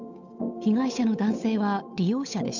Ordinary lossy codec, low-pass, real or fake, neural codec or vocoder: none; 7.2 kHz; fake; codec, 16 kHz, 8 kbps, FunCodec, trained on Chinese and English, 25 frames a second